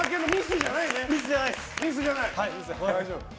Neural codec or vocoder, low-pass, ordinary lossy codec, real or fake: none; none; none; real